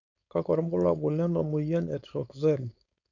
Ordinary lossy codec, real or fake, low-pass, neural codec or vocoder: none; fake; 7.2 kHz; codec, 16 kHz, 4.8 kbps, FACodec